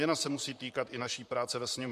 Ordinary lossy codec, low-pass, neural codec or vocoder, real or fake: MP3, 64 kbps; 14.4 kHz; vocoder, 44.1 kHz, 128 mel bands, Pupu-Vocoder; fake